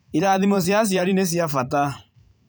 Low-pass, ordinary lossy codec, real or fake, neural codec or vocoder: none; none; fake; vocoder, 44.1 kHz, 128 mel bands every 512 samples, BigVGAN v2